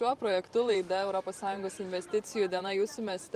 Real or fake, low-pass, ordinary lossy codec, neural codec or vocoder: fake; 14.4 kHz; Opus, 32 kbps; vocoder, 44.1 kHz, 128 mel bands every 512 samples, BigVGAN v2